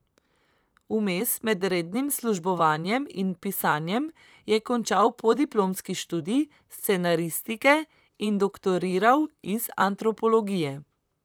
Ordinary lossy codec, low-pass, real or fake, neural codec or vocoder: none; none; fake; vocoder, 44.1 kHz, 128 mel bands, Pupu-Vocoder